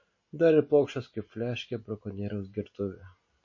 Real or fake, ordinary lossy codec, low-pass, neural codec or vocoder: real; MP3, 32 kbps; 7.2 kHz; none